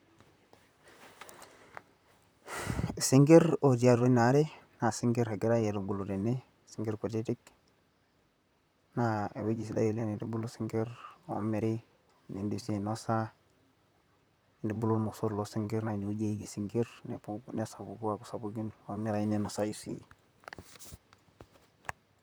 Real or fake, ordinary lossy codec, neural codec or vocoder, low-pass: fake; none; vocoder, 44.1 kHz, 128 mel bands, Pupu-Vocoder; none